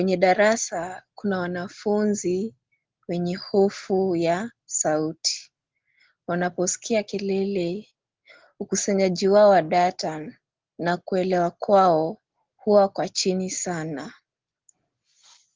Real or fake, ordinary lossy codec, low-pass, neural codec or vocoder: real; Opus, 16 kbps; 7.2 kHz; none